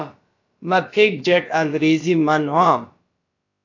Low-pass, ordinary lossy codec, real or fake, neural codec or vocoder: 7.2 kHz; AAC, 48 kbps; fake; codec, 16 kHz, about 1 kbps, DyCAST, with the encoder's durations